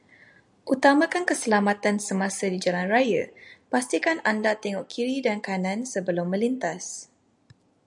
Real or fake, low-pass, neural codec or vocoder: real; 10.8 kHz; none